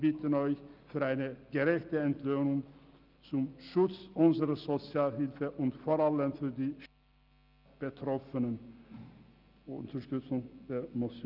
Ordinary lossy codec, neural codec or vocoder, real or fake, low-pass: Opus, 32 kbps; none; real; 5.4 kHz